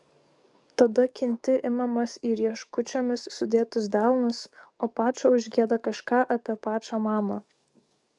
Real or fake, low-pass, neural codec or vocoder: fake; 10.8 kHz; codec, 44.1 kHz, 7.8 kbps, DAC